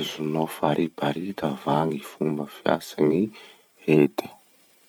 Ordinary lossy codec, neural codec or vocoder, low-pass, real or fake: none; vocoder, 44.1 kHz, 128 mel bands every 512 samples, BigVGAN v2; 19.8 kHz; fake